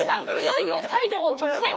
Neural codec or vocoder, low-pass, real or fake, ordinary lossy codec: codec, 16 kHz, 1 kbps, FreqCodec, larger model; none; fake; none